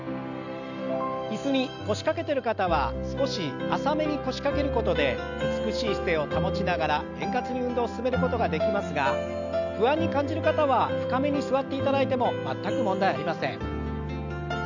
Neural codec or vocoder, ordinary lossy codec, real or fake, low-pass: none; none; real; 7.2 kHz